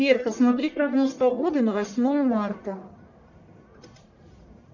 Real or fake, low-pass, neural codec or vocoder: fake; 7.2 kHz; codec, 44.1 kHz, 1.7 kbps, Pupu-Codec